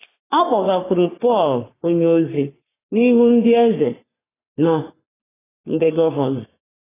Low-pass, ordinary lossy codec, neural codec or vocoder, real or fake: 3.6 kHz; AAC, 16 kbps; codec, 44.1 kHz, 3.4 kbps, Pupu-Codec; fake